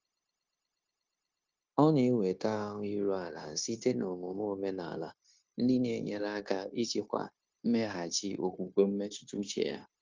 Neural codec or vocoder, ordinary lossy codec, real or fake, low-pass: codec, 16 kHz, 0.9 kbps, LongCat-Audio-Codec; Opus, 32 kbps; fake; 7.2 kHz